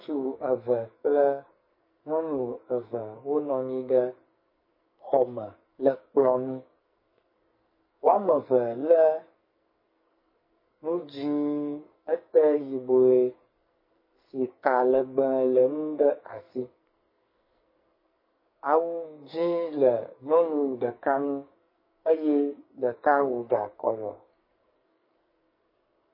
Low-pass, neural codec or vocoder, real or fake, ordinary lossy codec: 5.4 kHz; codec, 44.1 kHz, 2.6 kbps, SNAC; fake; MP3, 24 kbps